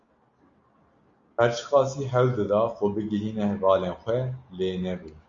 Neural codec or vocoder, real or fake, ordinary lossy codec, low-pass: none; real; Opus, 64 kbps; 7.2 kHz